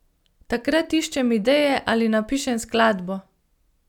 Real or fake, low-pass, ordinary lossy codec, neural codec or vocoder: real; 19.8 kHz; none; none